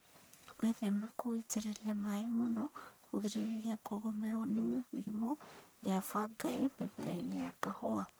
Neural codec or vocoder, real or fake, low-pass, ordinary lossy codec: codec, 44.1 kHz, 1.7 kbps, Pupu-Codec; fake; none; none